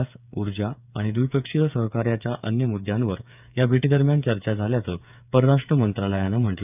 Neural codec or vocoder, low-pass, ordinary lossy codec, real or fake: codec, 16 kHz, 8 kbps, FreqCodec, smaller model; 3.6 kHz; none; fake